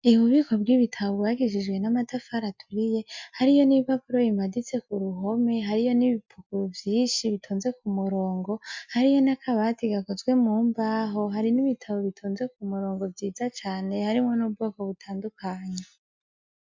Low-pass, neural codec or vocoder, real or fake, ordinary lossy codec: 7.2 kHz; none; real; MP3, 64 kbps